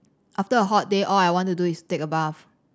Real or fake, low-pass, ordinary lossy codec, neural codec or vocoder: real; none; none; none